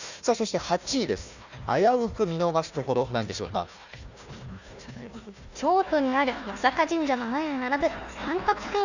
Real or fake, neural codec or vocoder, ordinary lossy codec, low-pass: fake; codec, 16 kHz, 1 kbps, FunCodec, trained on Chinese and English, 50 frames a second; none; 7.2 kHz